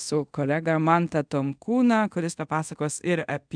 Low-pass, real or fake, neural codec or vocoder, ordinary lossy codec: 9.9 kHz; fake; codec, 24 kHz, 0.5 kbps, DualCodec; MP3, 96 kbps